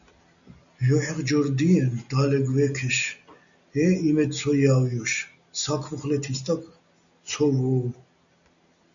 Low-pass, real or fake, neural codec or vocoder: 7.2 kHz; real; none